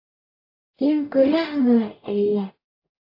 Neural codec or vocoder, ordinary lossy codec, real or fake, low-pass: codec, 44.1 kHz, 0.9 kbps, DAC; AAC, 32 kbps; fake; 5.4 kHz